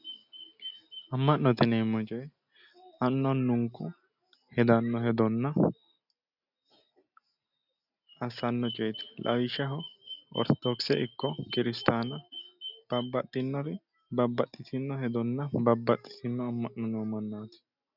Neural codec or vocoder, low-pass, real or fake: none; 5.4 kHz; real